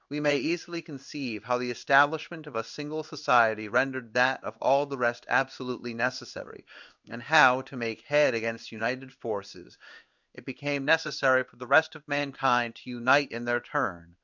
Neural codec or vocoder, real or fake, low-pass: codec, 16 kHz in and 24 kHz out, 1 kbps, XY-Tokenizer; fake; 7.2 kHz